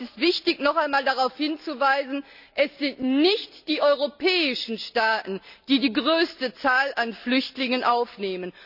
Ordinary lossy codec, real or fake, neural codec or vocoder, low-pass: none; real; none; 5.4 kHz